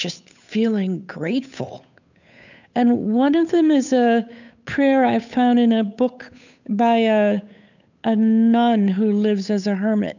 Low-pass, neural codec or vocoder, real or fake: 7.2 kHz; codec, 16 kHz, 8 kbps, FunCodec, trained on Chinese and English, 25 frames a second; fake